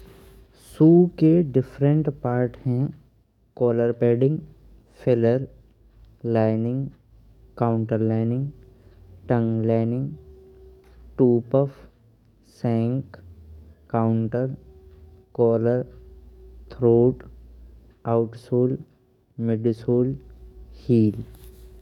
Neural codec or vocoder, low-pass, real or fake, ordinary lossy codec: codec, 44.1 kHz, 7.8 kbps, DAC; 19.8 kHz; fake; none